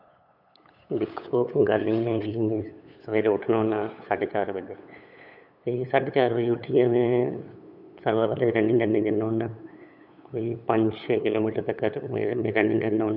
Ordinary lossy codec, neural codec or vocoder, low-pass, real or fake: none; codec, 16 kHz, 8 kbps, FunCodec, trained on LibriTTS, 25 frames a second; 5.4 kHz; fake